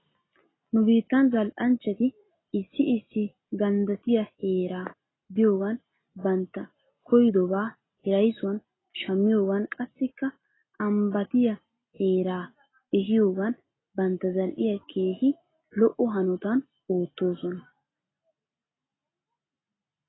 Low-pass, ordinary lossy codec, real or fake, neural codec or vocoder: 7.2 kHz; AAC, 16 kbps; real; none